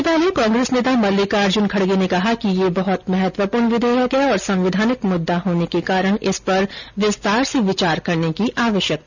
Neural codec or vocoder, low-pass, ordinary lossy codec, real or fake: none; 7.2 kHz; none; real